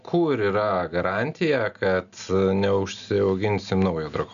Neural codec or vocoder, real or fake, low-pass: none; real; 7.2 kHz